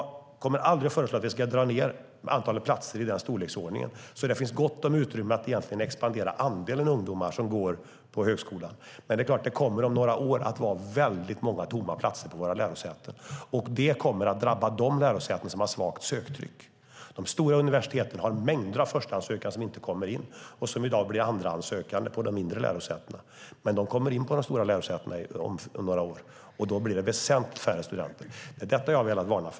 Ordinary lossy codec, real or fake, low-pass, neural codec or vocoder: none; real; none; none